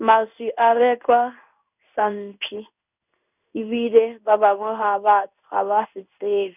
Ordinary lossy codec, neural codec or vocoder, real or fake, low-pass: none; codec, 16 kHz in and 24 kHz out, 1 kbps, XY-Tokenizer; fake; 3.6 kHz